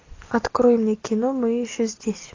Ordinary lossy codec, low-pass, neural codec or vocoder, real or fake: AAC, 32 kbps; 7.2 kHz; none; real